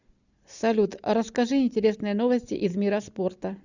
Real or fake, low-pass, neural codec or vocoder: real; 7.2 kHz; none